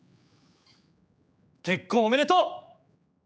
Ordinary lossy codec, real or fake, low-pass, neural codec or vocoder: none; fake; none; codec, 16 kHz, 4 kbps, X-Codec, HuBERT features, trained on general audio